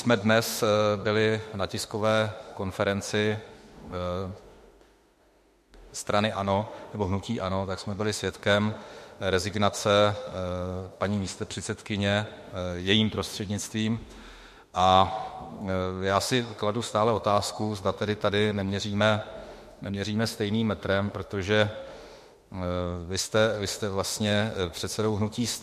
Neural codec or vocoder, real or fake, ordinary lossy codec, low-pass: autoencoder, 48 kHz, 32 numbers a frame, DAC-VAE, trained on Japanese speech; fake; MP3, 64 kbps; 14.4 kHz